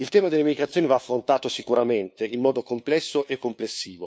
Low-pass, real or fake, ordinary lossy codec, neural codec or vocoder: none; fake; none; codec, 16 kHz, 2 kbps, FunCodec, trained on LibriTTS, 25 frames a second